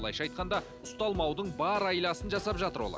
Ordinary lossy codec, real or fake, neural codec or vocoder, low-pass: none; real; none; none